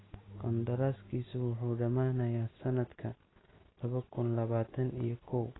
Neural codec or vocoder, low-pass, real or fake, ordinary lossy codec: none; 7.2 kHz; real; AAC, 16 kbps